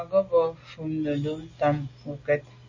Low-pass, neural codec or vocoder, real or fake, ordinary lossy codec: 7.2 kHz; none; real; MP3, 32 kbps